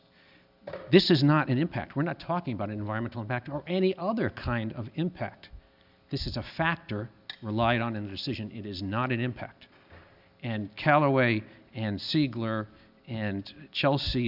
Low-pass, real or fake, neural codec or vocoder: 5.4 kHz; real; none